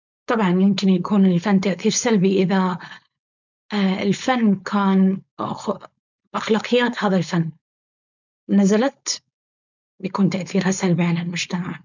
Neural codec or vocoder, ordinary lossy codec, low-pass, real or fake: codec, 16 kHz, 4.8 kbps, FACodec; none; 7.2 kHz; fake